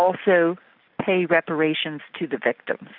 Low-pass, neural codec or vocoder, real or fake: 5.4 kHz; none; real